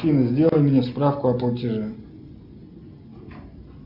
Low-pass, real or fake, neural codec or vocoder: 5.4 kHz; real; none